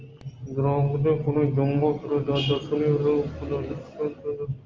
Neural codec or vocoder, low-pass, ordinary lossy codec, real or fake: none; 7.2 kHz; Opus, 24 kbps; real